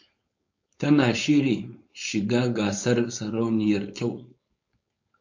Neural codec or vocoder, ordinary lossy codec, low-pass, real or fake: codec, 16 kHz, 4.8 kbps, FACodec; MP3, 48 kbps; 7.2 kHz; fake